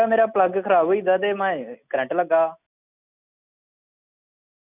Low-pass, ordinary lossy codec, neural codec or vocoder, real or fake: 3.6 kHz; none; none; real